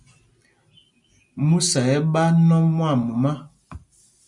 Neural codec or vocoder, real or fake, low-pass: none; real; 10.8 kHz